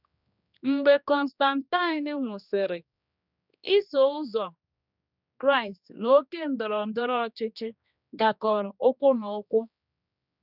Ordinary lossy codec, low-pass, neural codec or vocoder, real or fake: none; 5.4 kHz; codec, 16 kHz, 2 kbps, X-Codec, HuBERT features, trained on general audio; fake